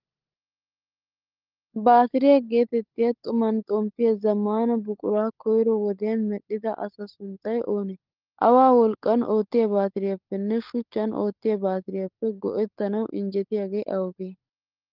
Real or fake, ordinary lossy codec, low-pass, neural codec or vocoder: fake; Opus, 32 kbps; 5.4 kHz; codec, 16 kHz, 16 kbps, FunCodec, trained on LibriTTS, 50 frames a second